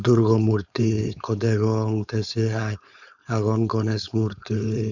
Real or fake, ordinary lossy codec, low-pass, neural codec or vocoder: fake; none; 7.2 kHz; codec, 16 kHz, 4.8 kbps, FACodec